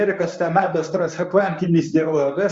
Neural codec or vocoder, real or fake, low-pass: codec, 24 kHz, 0.9 kbps, WavTokenizer, medium speech release version 1; fake; 9.9 kHz